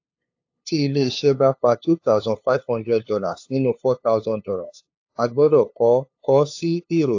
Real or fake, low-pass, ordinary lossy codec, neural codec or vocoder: fake; 7.2 kHz; AAC, 48 kbps; codec, 16 kHz, 2 kbps, FunCodec, trained on LibriTTS, 25 frames a second